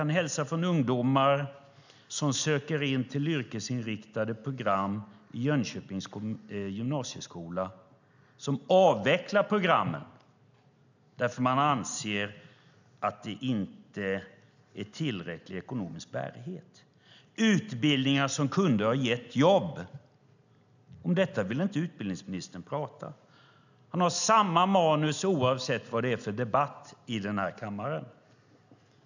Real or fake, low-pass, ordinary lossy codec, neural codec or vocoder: real; 7.2 kHz; none; none